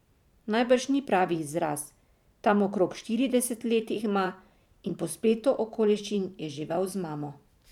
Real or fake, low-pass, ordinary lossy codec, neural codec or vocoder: fake; 19.8 kHz; none; vocoder, 44.1 kHz, 128 mel bands every 512 samples, BigVGAN v2